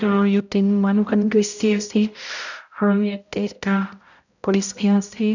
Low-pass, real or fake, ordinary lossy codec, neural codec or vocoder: 7.2 kHz; fake; none; codec, 16 kHz, 0.5 kbps, X-Codec, HuBERT features, trained on balanced general audio